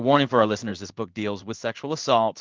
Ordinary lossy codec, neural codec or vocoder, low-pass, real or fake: Opus, 32 kbps; codec, 16 kHz in and 24 kHz out, 1 kbps, XY-Tokenizer; 7.2 kHz; fake